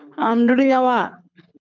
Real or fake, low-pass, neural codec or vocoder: fake; 7.2 kHz; codec, 24 kHz, 6 kbps, HILCodec